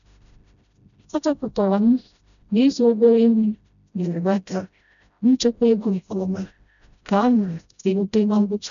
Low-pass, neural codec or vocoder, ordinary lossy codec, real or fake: 7.2 kHz; codec, 16 kHz, 0.5 kbps, FreqCodec, smaller model; none; fake